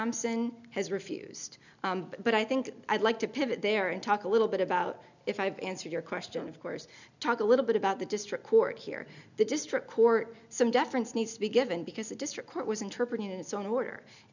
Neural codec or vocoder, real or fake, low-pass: none; real; 7.2 kHz